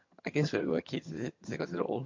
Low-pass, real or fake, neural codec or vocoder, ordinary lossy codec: 7.2 kHz; fake; vocoder, 22.05 kHz, 80 mel bands, HiFi-GAN; MP3, 48 kbps